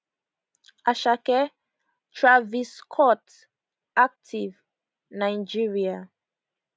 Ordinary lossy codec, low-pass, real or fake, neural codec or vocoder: none; none; real; none